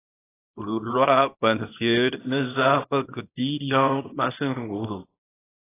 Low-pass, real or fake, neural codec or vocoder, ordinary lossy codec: 3.6 kHz; fake; codec, 24 kHz, 0.9 kbps, WavTokenizer, small release; AAC, 16 kbps